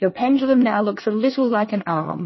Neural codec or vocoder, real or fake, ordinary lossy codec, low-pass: codec, 24 kHz, 1 kbps, SNAC; fake; MP3, 24 kbps; 7.2 kHz